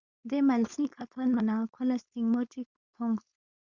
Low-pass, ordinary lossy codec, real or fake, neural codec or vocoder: 7.2 kHz; Opus, 64 kbps; fake; codec, 16 kHz, 4.8 kbps, FACodec